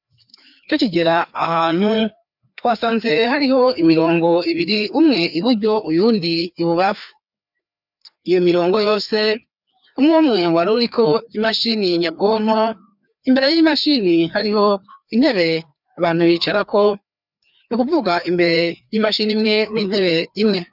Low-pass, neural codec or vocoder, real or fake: 5.4 kHz; codec, 16 kHz, 2 kbps, FreqCodec, larger model; fake